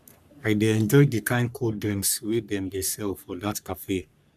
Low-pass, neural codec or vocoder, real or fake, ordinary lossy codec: 14.4 kHz; codec, 44.1 kHz, 3.4 kbps, Pupu-Codec; fake; none